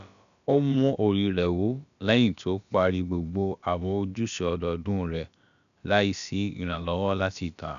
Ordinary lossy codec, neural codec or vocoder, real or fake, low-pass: none; codec, 16 kHz, about 1 kbps, DyCAST, with the encoder's durations; fake; 7.2 kHz